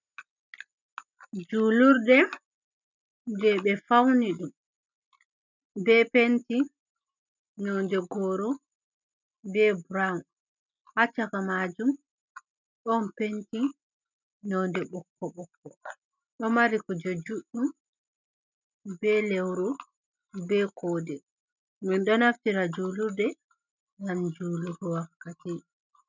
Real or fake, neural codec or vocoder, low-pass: real; none; 7.2 kHz